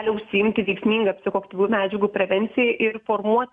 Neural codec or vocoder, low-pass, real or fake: none; 10.8 kHz; real